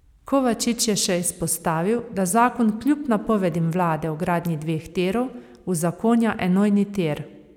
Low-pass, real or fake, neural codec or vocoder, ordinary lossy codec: 19.8 kHz; fake; vocoder, 44.1 kHz, 128 mel bands every 256 samples, BigVGAN v2; none